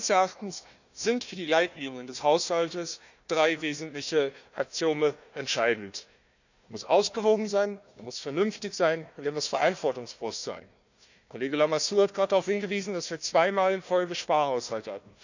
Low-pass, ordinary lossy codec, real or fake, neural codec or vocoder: 7.2 kHz; none; fake; codec, 16 kHz, 1 kbps, FunCodec, trained on Chinese and English, 50 frames a second